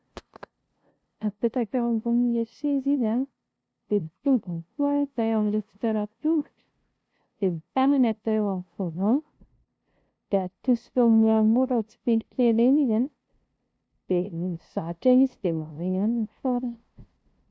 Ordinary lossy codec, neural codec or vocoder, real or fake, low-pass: none; codec, 16 kHz, 0.5 kbps, FunCodec, trained on LibriTTS, 25 frames a second; fake; none